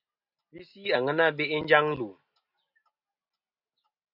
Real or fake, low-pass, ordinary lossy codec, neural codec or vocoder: real; 5.4 kHz; AAC, 48 kbps; none